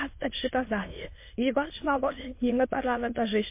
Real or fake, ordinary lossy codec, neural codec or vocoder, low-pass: fake; MP3, 24 kbps; autoencoder, 22.05 kHz, a latent of 192 numbers a frame, VITS, trained on many speakers; 3.6 kHz